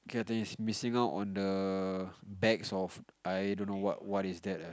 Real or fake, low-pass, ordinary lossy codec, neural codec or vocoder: real; none; none; none